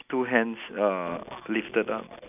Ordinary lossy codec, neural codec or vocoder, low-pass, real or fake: none; none; 3.6 kHz; real